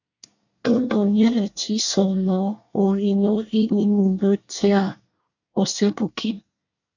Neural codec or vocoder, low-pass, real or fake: codec, 24 kHz, 1 kbps, SNAC; 7.2 kHz; fake